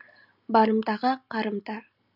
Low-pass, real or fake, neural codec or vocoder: 5.4 kHz; real; none